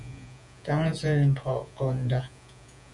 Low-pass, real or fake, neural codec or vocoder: 10.8 kHz; fake; vocoder, 48 kHz, 128 mel bands, Vocos